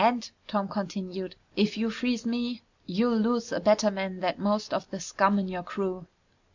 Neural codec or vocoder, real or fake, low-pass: none; real; 7.2 kHz